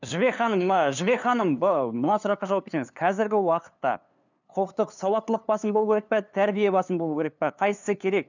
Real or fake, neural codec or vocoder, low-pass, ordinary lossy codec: fake; codec, 16 kHz, 2 kbps, FunCodec, trained on LibriTTS, 25 frames a second; 7.2 kHz; none